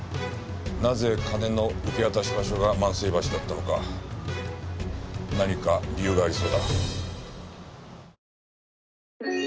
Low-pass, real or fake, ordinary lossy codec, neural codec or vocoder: none; real; none; none